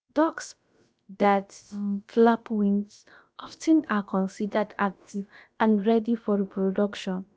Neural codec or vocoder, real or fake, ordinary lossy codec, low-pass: codec, 16 kHz, about 1 kbps, DyCAST, with the encoder's durations; fake; none; none